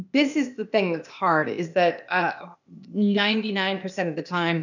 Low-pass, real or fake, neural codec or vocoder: 7.2 kHz; fake; codec, 16 kHz, 0.8 kbps, ZipCodec